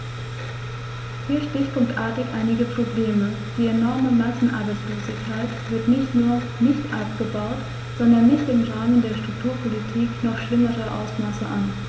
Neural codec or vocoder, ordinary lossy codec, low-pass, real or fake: none; none; none; real